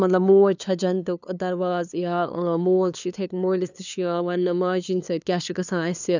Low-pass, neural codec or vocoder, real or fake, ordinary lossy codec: 7.2 kHz; codec, 16 kHz, 2 kbps, FunCodec, trained on LibriTTS, 25 frames a second; fake; none